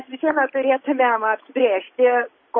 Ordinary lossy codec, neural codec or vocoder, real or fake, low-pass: MP3, 24 kbps; none; real; 7.2 kHz